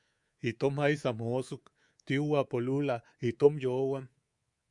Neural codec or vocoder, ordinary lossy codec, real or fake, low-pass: codec, 24 kHz, 3.1 kbps, DualCodec; Opus, 64 kbps; fake; 10.8 kHz